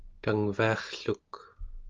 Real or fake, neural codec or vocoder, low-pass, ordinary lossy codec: real; none; 7.2 kHz; Opus, 32 kbps